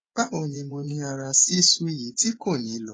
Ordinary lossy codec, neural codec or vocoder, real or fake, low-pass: AAC, 32 kbps; vocoder, 24 kHz, 100 mel bands, Vocos; fake; 9.9 kHz